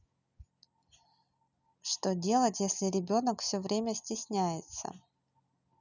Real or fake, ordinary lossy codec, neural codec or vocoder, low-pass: real; none; none; 7.2 kHz